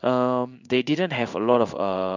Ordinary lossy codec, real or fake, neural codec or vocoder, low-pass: none; fake; codec, 16 kHz in and 24 kHz out, 1 kbps, XY-Tokenizer; 7.2 kHz